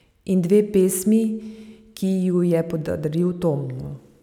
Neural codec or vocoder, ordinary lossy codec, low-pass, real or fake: none; none; 19.8 kHz; real